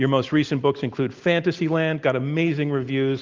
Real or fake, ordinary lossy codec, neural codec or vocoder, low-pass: real; Opus, 32 kbps; none; 7.2 kHz